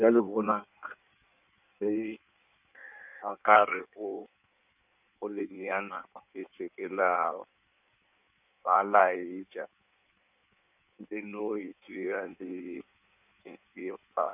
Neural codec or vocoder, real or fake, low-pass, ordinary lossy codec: codec, 16 kHz in and 24 kHz out, 1.1 kbps, FireRedTTS-2 codec; fake; 3.6 kHz; none